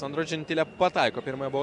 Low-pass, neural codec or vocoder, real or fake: 10.8 kHz; none; real